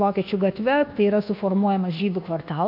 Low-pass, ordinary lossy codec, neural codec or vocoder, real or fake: 5.4 kHz; MP3, 48 kbps; codec, 24 kHz, 1.2 kbps, DualCodec; fake